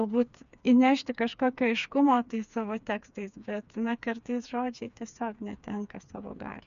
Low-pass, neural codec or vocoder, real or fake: 7.2 kHz; codec, 16 kHz, 4 kbps, FreqCodec, smaller model; fake